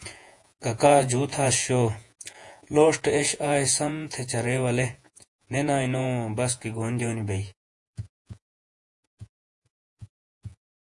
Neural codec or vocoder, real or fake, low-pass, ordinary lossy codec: vocoder, 48 kHz, 128 mel bands, Vocos; fake; 10.8 kHz; AAC, 64 kbps